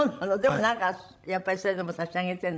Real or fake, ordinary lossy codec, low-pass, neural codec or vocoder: fake; none; none; codec, 16 kHz, 16 kbps, FreqCodec, larger model